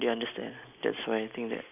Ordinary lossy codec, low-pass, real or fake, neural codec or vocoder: none; 3.6 kHz; real; none